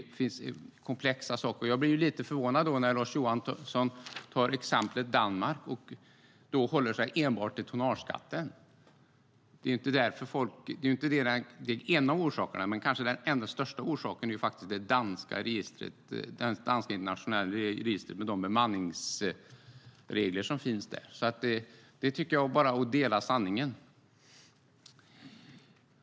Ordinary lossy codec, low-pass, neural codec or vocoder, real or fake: none; none; none; real